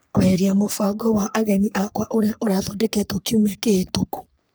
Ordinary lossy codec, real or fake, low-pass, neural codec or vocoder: none; fake; none; codec, 44.1 kHz, 3.4 kbps, Pupu-Codec